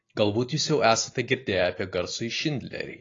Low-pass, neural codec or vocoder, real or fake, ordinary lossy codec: 7.2 kHz; none; real; AAC, 32 kbps